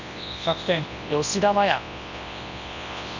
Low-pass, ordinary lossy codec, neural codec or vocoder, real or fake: 7.2 kHz; none; codec, 24 kHz, 0.9 kbps, WavTokenizer, large speech release; fake